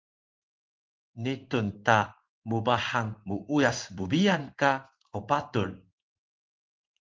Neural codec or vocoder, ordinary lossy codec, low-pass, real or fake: codec, 16 kHz in and 24 kHz out, 1 kbps, XY-Tokenizer; Opus, 32 kbps; 7.2 kHz; fake